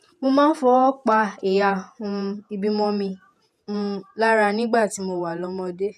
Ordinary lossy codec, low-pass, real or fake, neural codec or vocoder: none; 14.4 kHz; fake; vocoder, 48 kHz, 128 mel bands, Vocos